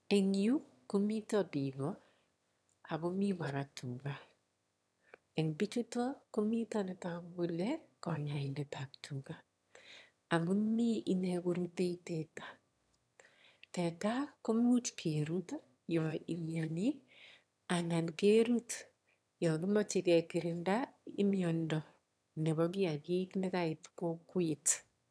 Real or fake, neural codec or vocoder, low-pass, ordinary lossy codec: fake; autoencoder, 22.05 kHz, a latent of 192 numbers a frame, VITS, trained on one speaker; none; none